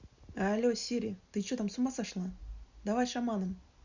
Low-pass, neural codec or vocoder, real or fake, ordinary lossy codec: 7.2 kHz; none; real; Opus, 64 kbps